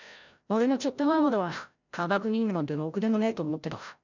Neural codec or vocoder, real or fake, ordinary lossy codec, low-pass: codec, 16 kHz, 0.5 kbps, FreqCodec, larger model; fake; none; 7.2 kHz